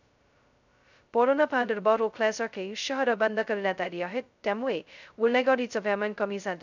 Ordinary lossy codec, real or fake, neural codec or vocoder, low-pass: none; fake; codec, 16 kHz, 0.2 kbps, FocalCodec; 7.2 kHz